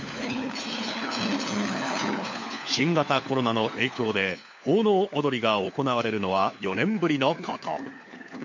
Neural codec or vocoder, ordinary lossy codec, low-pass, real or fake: codec, 16 kHz, 4 kbps, FunCodec, trained on LibriTTS, 50 frames a second; MP3, 48 kbps; 7.2 kHz; fake